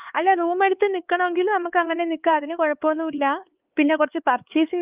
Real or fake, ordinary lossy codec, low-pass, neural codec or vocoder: fake; Opus, 24 kbps; 3.6 kHz; codec, 16 kHz, 4 kbps, X-Codec, HuBERT features, trained on LibriSpeech